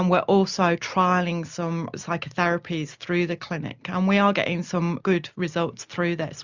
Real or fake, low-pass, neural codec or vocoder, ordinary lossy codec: real; 7.2 kHz; none; Opus, 64 kbps